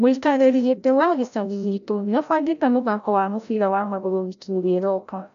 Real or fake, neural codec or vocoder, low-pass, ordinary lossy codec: fake; codec, 16 kHz, 0.5 kbps, FreqCodec, larger model; 7.2 kHz; none